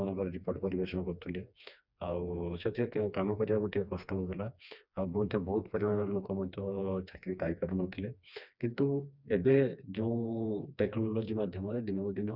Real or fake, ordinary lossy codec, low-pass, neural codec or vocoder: fake; none; 5.4 kHz; codec, 16 kHz, 2 kbps, FreqCodec, smaller model